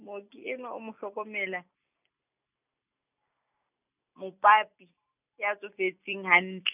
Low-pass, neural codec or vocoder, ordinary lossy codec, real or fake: 3.6 kHz; none; none; real